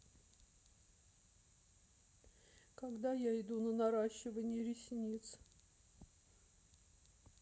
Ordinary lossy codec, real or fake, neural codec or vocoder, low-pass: none; real; none; none